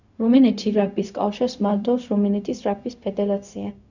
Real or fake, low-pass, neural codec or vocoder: fake; 7.2 kHz; codec, 16 kHz, 0.4 kbps, LongCat-Audio-Codec